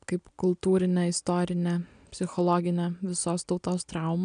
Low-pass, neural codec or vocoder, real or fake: 9.9 kHz; none; real